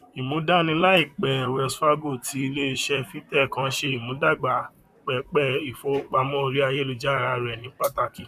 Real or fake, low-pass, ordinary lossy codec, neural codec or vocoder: fake; 14.4 kHz; none; vocoder, 44.1 kHz, 128 mel bands, Pupu-Vocoder